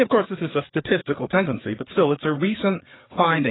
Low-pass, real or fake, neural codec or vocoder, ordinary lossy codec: 7.2 kHz; fake; codec, 16 kHz, 4 kbps, FreqCodec, larger model; AAC, 16 kbps